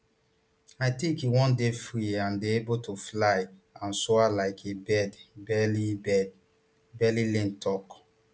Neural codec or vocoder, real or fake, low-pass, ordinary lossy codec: none; real; none; none